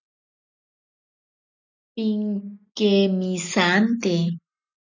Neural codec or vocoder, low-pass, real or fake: none; 7.2 kHz; real